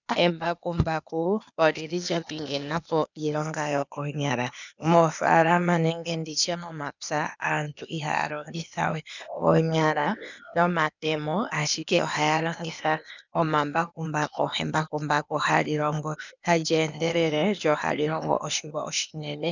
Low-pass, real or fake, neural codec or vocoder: 7.2 kHz; fake; codec, 16 kHz, 0.8 kbps, ZipCodec